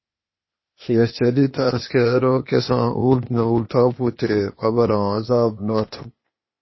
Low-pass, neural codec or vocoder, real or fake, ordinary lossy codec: 7.2 kHz; codec, 16 kHz, 0.8 kbps, ZipCodec; fake; MP3, 24 kbps